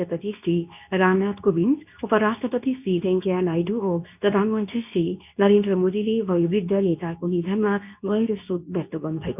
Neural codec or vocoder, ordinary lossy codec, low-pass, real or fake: codec, 24 kHz, 0.9 kbps, WavTokenizer, medium speech release version 2; none; 3.6 kHz; fake